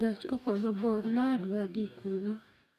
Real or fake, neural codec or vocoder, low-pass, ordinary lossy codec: fake; codec, 44.1 kHz, 2.6 kbps, DAC; 14.4 kHz; none